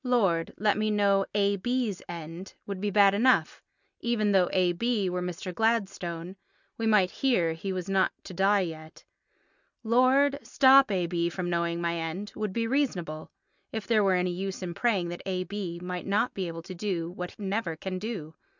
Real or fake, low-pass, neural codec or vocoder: real; 7.2 kHz; none